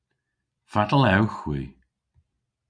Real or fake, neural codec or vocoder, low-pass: real; none; 9.9 kHz